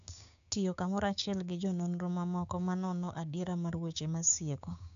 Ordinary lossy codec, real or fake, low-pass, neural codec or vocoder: AAC, 64 kbps; fake; 7.2 kHz; codec, 16 kHz, 6 kbps, DAC